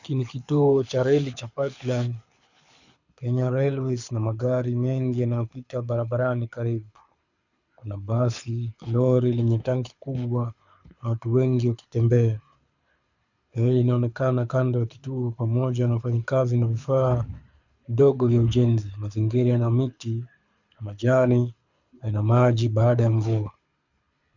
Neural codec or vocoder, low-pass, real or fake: codec, 24 kHz, 6 kbps, HILCodec; 7.2 kHz; fake